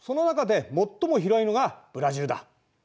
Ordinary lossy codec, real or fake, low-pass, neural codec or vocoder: none; real; none; none